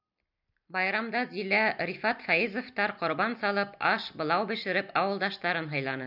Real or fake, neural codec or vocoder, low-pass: real; none; 5.4 kHz